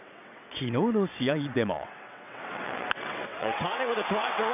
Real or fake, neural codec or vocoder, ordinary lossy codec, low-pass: fake; vocoder, 22.05 kHz, 80 mel bands, WaveNeXt; none; 3.6 kHz